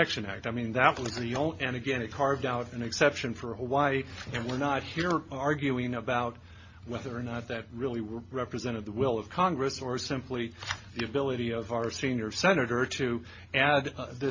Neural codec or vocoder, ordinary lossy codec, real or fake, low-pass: none; MP3, 32 kbps; real; 7.2 kHz